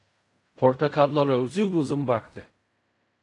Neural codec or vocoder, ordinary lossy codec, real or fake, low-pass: codec, 16 kHz in and 24 kHz out, 0.4 kbps, LongCat-Audio-Codec, fine tuned four codebook decoder; AAC, 48 kbps; fake; 10.8 kHz